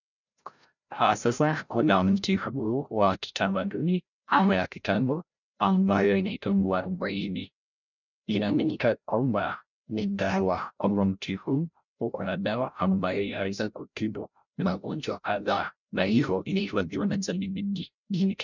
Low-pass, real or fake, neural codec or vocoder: 7.2 kHz; fake; codec, 16 kHz, 0.5 kbps, FreqCodec, larger model